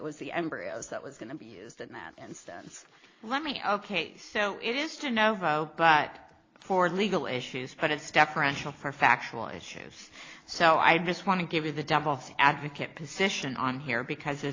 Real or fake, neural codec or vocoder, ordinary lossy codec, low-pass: real; none; AAC, 32 kbps; 7.2 kHz